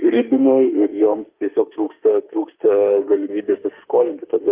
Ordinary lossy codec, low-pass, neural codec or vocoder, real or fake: Opus, 24 kbps; 3.6 kHz; autoencoder, 48 kHz, 32 numbers a frame, DAC-VAE, trained on Japanese speech; fake